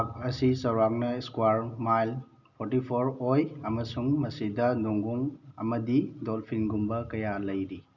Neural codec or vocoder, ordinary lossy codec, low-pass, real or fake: none; none; 7.2 kHz; real